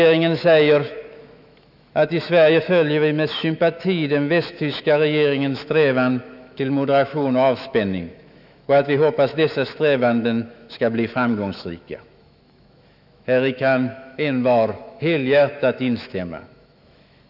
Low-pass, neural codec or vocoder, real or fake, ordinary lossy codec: 5.4 kHz; autoencoder, 48 kHz, 128 numbers a frame, DAC-VAE, trained on Japanese speech; fake; none